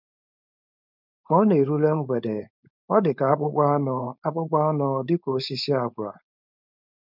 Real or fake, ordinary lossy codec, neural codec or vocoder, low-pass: fake; none; codec, 16 kHz, 4.8 kbps, FACodec; 5.4 kHz